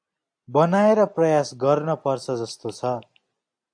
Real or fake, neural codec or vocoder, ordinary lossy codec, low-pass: real; none; AAC, 64 kbps; 9.9 kHz